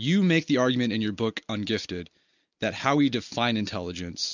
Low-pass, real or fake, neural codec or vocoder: 7.2 kHz; real; none